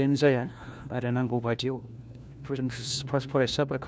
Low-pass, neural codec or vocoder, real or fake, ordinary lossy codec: none; codec, 16 kHz, 1 kbps, FunCodec, trained on LibriTTS, 50 frames a second; fake; none